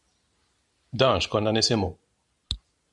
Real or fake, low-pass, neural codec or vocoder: real; 10.8 kHz; none